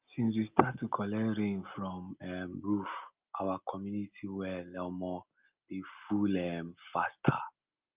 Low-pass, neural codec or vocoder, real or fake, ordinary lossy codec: 3.6 kHz; none; real; Opus, 24 kbps